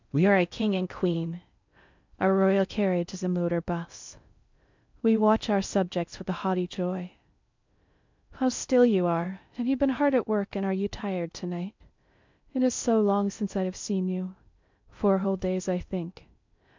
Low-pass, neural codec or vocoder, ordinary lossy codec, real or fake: 7.2 kHz; codec, 16 kHz in and 24 kHz out, 0.6 kbps, FocalCodec, streaming, 4096 codes; MP3, 64 kbps; fake